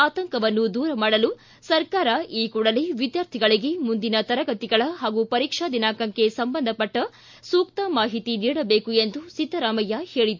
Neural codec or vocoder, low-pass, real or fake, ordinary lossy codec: none; 7.2 kHz; real; MP3, 48 kbps